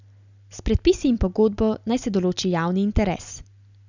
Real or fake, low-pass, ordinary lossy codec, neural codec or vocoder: real; 7.2 kHz; none; none